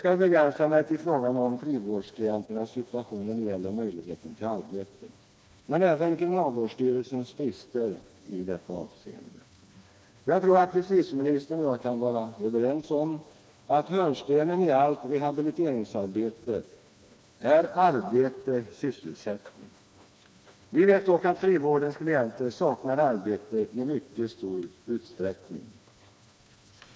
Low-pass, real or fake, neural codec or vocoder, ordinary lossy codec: none; fake; codec, 16 kHz, 2 kbps, FreqCodec, smaller model; none